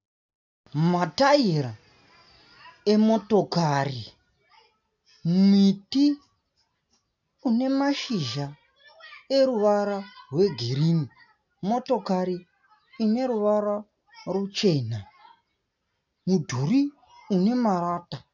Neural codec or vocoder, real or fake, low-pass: none; real; 7.2 kHz